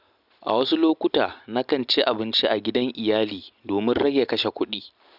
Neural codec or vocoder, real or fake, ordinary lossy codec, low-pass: none; real; none; 5.4 kHz